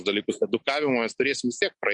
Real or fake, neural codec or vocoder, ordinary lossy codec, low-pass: real; none; MP3, 48 kbps; 10.8 kHz